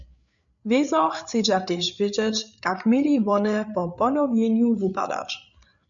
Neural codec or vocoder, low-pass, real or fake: codec, 16 kHz, 8 kbps, FreqCodec, larger model; 7.2 kHz; fake